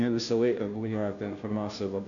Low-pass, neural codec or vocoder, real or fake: 7.2 kHz; codec, 16 kHz, 0.5 kbps, FunCodec, trained on Chinese and English, 25 frames a second; fake